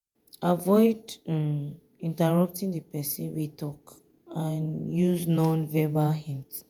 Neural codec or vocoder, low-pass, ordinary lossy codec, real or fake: vocoder, 48 kHz, 128 mel bands, Vocos; none; none; fake